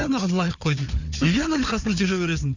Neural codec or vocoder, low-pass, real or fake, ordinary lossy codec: codec, 16 kHz, 4 kbps, FunCodec, trained on Chinese and English, 50 frames a second; 7.2 kHz; fake; none